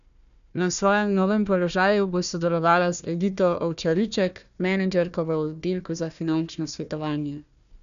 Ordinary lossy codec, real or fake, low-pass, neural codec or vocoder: none; fake; 7.2 kHz; codec, 16 kHz, 1 kbps, FunCodec, trained on Chinese and English, 50 frames a second